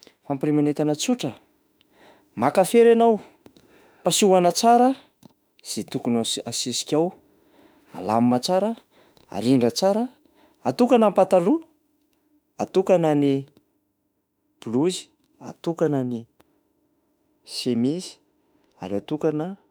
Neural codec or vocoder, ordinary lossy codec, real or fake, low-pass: autoencoder, 48 kHz, 32 numbers a frame, DAC-VAE, trained on Japanese speech; none; fake; none